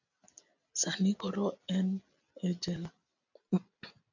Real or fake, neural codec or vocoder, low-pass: real; none; 7.2 kHz